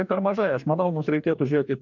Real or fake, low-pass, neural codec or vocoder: fake; 7.2 kHz; codec, 44.1 kHz, 2.6 kbps, DAC